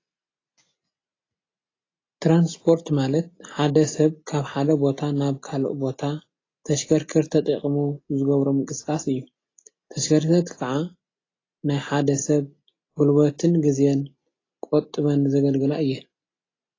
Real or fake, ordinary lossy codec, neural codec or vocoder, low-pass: real; AAC, 32 kbps; none; 7.2 kHz